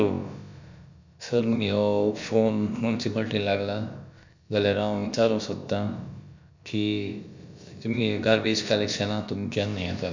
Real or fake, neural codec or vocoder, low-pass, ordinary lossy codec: fake; codec, 16 kHz, about 1 kbps, DyCAST, with the encoder's durations; 7.2 kHz; MP3, 64 kbps